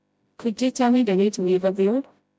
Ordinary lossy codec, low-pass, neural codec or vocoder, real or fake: none; none; codec, 16 kHz, 0.5 kbps, FreqCodec, smaller model; fake